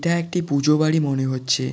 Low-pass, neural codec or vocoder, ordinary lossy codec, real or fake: none; none; none; real